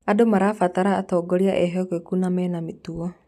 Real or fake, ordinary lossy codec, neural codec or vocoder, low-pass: real; none; none; 14.4 kHz